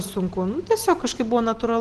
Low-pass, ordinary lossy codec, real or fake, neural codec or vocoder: 10.8 kHz; Opus, 16 kbps; real; none